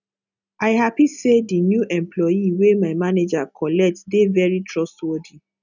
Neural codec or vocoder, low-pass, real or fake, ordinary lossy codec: none; 7.2 kHz; real; none